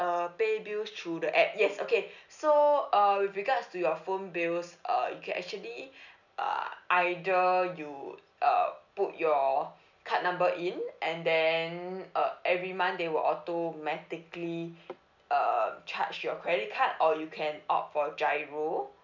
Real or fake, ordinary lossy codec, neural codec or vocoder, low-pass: real; none; none; 7.2 kHz